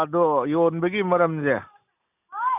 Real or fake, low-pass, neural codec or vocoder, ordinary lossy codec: real; 3.6 kHz; none; none